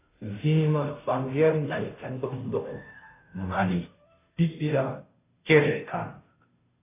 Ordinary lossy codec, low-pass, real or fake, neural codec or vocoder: AAC, 24 kbps; 3.6 kHz; fake; codec, 16 kHz, 0.5 kbps, FunCodec, trained on Chinese and English, 25 frames a second